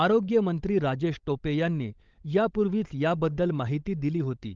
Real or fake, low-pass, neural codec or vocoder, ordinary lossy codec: fake; 7.2 kHz; codec, 16 kHz, 16 kbps, FunCodec, trained on LibriTTS, 50 frames a second; Opus, 32 kbps